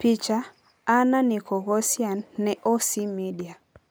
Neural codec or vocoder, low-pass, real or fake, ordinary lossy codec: none; none; real; none